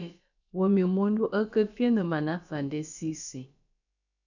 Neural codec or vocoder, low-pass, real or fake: codec, 16 kHz, about 1 kbps, DyCAST, with the encoder's durations; 7.2 kHz; fake